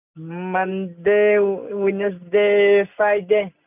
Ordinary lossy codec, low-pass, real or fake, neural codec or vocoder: none; 3.6 kHz; real; none